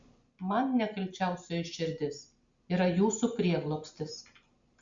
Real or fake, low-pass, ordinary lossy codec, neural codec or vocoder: real; 7.2 kHz; Opus, 64 kbps; none